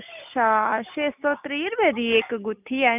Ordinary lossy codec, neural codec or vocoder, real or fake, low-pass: none; none; real; 3.6 kHz